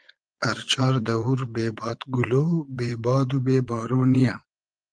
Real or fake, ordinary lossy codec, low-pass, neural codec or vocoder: fake; Opus, 24 kbps; 9.9 kHz; vocoder, 22.05 kHz, 80 mel bands, Vocos